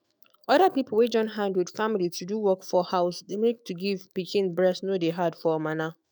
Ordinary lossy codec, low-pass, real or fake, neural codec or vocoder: none; none; fake; autoencoder, 48 kHz, 128 numbers a frame, DAC-VAE, trained on Japanese speech